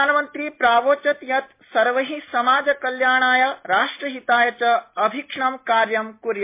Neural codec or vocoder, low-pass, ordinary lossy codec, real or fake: none; 3.6 kHz; MP3, 24 kbps; real